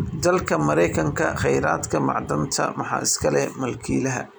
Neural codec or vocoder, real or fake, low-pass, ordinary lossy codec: none; real; none; none